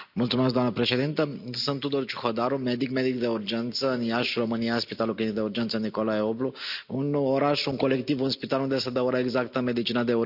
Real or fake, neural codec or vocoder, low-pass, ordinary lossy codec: real; none; 5.4 kHz; none